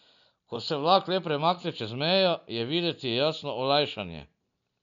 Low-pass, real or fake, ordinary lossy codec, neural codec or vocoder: 7.2 kHz; real; none; none